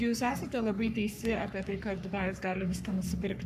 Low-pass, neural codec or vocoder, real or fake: 14.4 kHz; codec, 44.1 kHz, 3.4 kbps, Pupu-Codec; fake